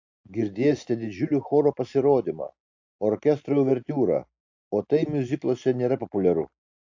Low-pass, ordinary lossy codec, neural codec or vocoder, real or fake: 7.2 kHz; AAC, 48 kbps; none; real